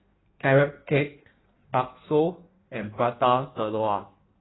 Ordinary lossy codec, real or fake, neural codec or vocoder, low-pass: AAC, 16 kbps; fake; codec, 16 kHz in and 24 kHz out, 1.1 kbps, FireRedTTS-2 codec; 7.2 kHz